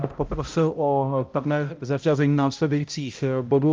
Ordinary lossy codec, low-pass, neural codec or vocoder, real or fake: Opus, 24 kbps; 7.2 kHz; codec, 16 kHz, 0.5 kbps, X-Codec, HuBERT features, trained on balanced general audio; fake